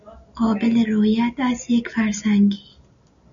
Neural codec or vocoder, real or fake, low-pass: none; real; 7.2 kHz